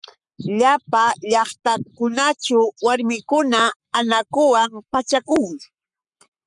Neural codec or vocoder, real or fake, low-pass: codec, 44.1 kHz, 7.8 kbps, Pupu-Codec; fake; 10.8 kHz